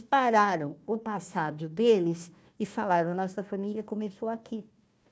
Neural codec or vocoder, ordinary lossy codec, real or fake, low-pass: codec, 16 kHz, 1 kbps, FunCodec, trained on Chinese and English, 50 frames a second; none; fake; none